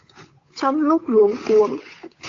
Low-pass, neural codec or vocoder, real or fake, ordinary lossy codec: 7.2 kHz; codec, 16 kHz, 8 kbps, FunCodec, trained on Chinese and English, 25 frames a second; fake; AAC, 48 kbps